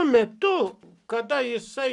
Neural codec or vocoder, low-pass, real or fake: codec, 44.1 kHz, 7.8 kbps, Pupu-Codec; 10.8 kHz; fake